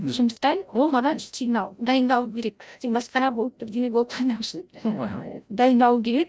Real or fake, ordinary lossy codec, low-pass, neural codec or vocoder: fake; none; none; codec, 16 kHz, 0.5 kbps, FreqCodec, larger model